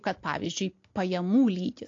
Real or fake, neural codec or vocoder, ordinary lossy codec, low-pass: real; none; AAC, 48 kbps; 7.2 kHz